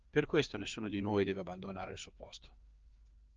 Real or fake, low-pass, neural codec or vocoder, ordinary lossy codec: fake; 7.2 kHz; codec, 16 kHz, 2 kbps, FreqCodec, larger model; Opus, 24 kbps